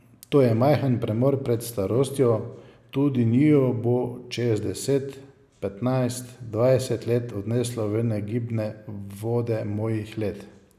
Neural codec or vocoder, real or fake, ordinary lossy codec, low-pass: none; real; none; 14.4 kHz